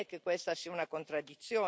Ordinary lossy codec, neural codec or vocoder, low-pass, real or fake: none; none; none; real